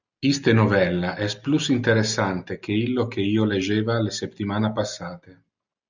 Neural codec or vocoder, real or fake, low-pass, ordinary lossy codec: none; real; 7.2 kHz; Opus, 64 kbps